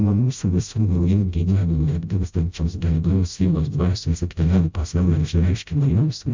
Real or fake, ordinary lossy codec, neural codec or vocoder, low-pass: fake; MP3, 64 kbps; codec, 16 kHz, 0.5 kbps, FreqCodec, smaller model; 7.2 kHz